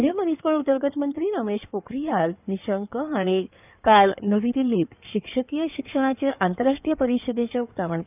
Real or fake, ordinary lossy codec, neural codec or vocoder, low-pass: fake; none; codec, 16 kHz in and 24 kHz out, 2.2 kbps, FireRedTTS-2 codec; 3.6 kHz